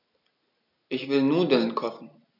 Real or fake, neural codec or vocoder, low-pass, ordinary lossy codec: real; none; 5.4 kHz; none